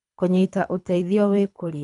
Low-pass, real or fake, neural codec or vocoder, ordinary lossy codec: 10.8 kHz; fake; codec, 24 kHz, 3 kbps, HILCodec; none